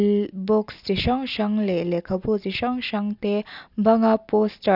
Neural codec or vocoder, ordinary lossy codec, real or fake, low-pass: none; none; real; 5.4 kHz